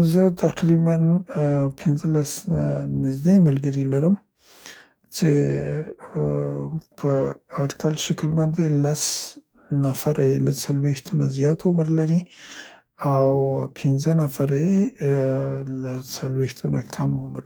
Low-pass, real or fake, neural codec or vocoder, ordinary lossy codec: none; fake; codec, 44.1 kHz, 2.6 kbps, DAC; none